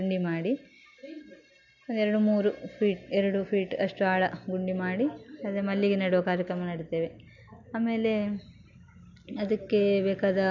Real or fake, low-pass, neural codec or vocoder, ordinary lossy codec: real; 7.2 kHz; none; none